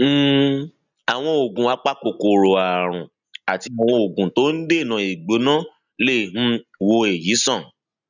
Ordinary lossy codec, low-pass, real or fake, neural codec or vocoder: none; 7.2 kHz; real; none